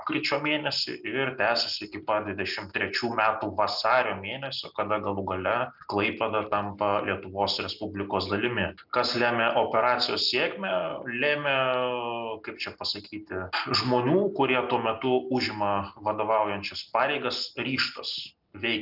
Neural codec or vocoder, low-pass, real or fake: none; 5.4 kHz; real